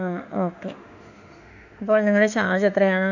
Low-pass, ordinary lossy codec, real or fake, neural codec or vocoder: 7.2 kHz; none; fake; autoencoder, 48 kHz, 32 numbers a frame, DAC-VAE, trained on Japanese speech